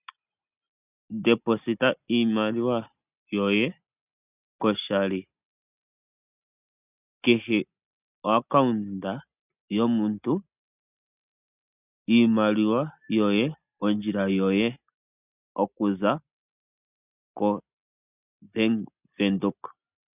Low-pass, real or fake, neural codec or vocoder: 3.6 kHz; real; none